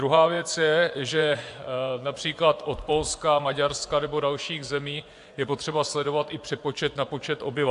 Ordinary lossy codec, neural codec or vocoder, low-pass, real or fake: AAC, 64 kbps; vocoder, 24 kHz, 100 mel bands, Vocos; 10.8 kHz; fake